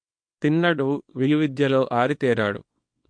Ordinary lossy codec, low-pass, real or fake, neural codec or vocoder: MP3, 48 kbps; 9.9 kHz; fake; codec, 24 kHz, 0.9 kbps, WavTokenizer, small release